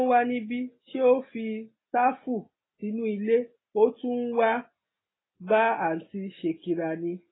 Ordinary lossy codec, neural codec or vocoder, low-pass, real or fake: AAC, 16 kbps; none; 7.2 kHz; real